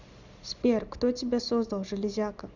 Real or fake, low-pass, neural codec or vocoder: real; 7.2 kHz; none